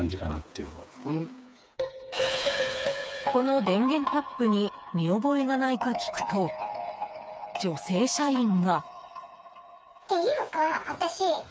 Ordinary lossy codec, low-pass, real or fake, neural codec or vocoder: none; none; fake; codec, 16 kHz, 4 kbps, FreqCodec, smaller model